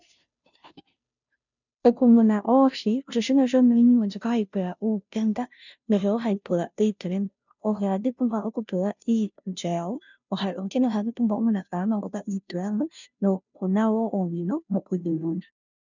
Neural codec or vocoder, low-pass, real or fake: codec, 16 kHz, 0.5 kbps, FunCodec, trained on Chinese and English, 25 frames a second; 7.2 kHz; fake